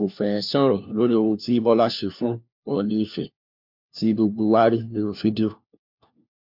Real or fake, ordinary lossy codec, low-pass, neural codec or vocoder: fake; MP3, 48 kbps; 5.4 kHz; codec, 16 kHz, 1 kbps, FunCodec, trained on LibriTTS, 50 frames a second